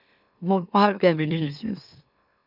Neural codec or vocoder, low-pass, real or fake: autoencoder, 44.1 kHz, a latent of 192 numbers a frame, MeloTTS; 5.4 kHz; fake